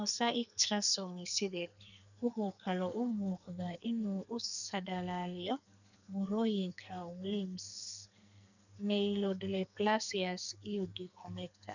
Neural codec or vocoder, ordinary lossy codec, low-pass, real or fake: codec, 44.1 kHz, 2.6 kbps, SNAC; none; 7.2 kHz; fake